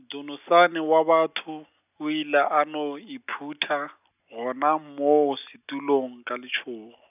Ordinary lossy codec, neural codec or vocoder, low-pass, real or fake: none; none; 3.6 kHz; real